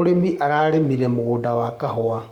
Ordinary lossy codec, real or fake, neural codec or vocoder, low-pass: Opus, 64 kbps; fake; codec, 44.1 kHz, 7.8 kbps, Pupu-Codec; 19.8 kHz